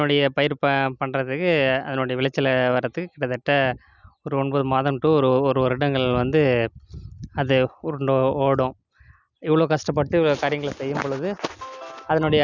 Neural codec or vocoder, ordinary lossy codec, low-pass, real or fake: none; none; 7.2 kHz; real